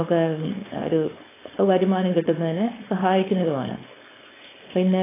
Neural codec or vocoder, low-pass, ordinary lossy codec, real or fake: codec, 16 kHz, 4.8 kbps, FACodec; 3.6 kHz; AAC, 16 kbps; fake